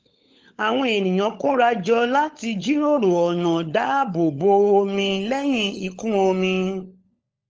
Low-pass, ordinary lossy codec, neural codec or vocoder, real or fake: 7.2 kHz; Opus, 24 kbps; codec, 16 kHz, 16 kbps, FunCodec, trained on LibriTTS, 50 frames a second; fake